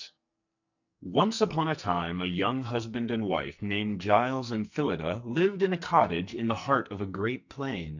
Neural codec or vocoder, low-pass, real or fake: codec, 44.1 kHz, 2.6 kbps, SNAC; 7.2 kHz; fake